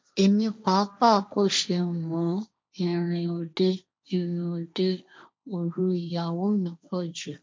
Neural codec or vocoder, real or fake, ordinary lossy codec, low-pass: codec, 16 kHz, 1.1 kbps, Voila-Tokenizer; fake; none; none